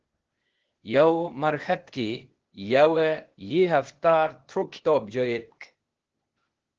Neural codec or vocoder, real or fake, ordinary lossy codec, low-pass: codec, 16 kHz, 0.8 kbps, ZipCodec; fake; Opus, 16 kbps; 7.2 kHz